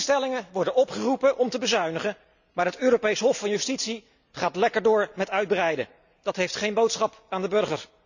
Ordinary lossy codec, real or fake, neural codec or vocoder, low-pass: none; real; none; 7.2 kHz